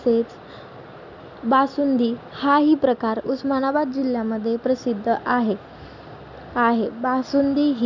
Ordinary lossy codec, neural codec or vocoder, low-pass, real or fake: none; none; 7.2 kHz; real